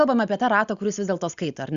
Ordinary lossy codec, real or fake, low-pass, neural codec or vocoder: Opus, 64 kbps; real; 7.2 kHz; none